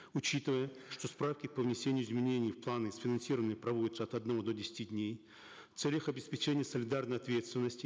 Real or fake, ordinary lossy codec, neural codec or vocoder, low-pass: real; none; none; none